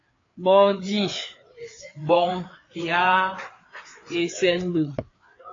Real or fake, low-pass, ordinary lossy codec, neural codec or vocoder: fake; 7.2 kHz; AAC, 32 kbps; codec, 16 kHz, 4 kbps, FreqCodec, larger model